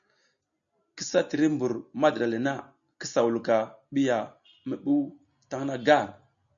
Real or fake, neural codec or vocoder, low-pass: real; none; 7.2 kHz